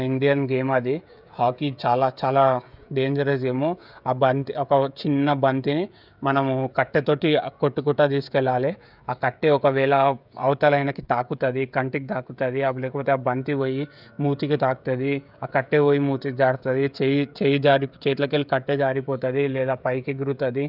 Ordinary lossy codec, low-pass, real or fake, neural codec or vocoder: none; 5.4 kHz; fake; codec, 16 kHz, 16 kbps, FreqCodec, smaller model